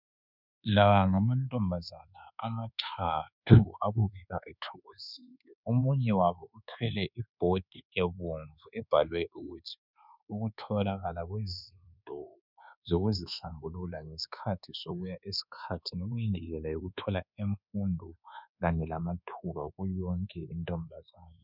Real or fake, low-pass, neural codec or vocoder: fake; 5.4 kHz; codec, 24 kHz, 1.2 kbps, DualCodec